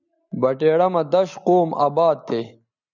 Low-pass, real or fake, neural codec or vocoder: 7.2 kHz; real; none